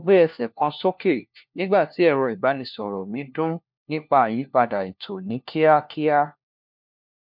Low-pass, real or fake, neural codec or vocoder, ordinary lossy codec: 5.4 kHz; fake; codec, 16 kHz, 1 kbps, FunCodec, trained on LibriTTS, 50 frames a second; MP3, 48 kbps